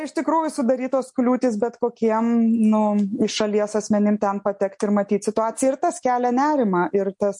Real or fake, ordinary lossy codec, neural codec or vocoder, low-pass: real; MP3, 48 kbps; none; 10.8 kHz